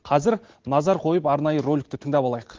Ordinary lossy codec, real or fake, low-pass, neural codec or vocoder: Opus, 16 kbps; real; 7.2 kHz; none